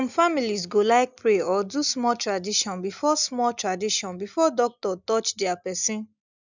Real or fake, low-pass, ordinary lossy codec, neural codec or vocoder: real; 7.2 kHz; none; none